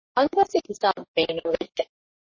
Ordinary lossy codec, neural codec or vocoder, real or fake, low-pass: MP3, 32 kbps; codec, 44.1 kHz, 3.4 kbps, Pupu-Codec; fake; 7.2 kHz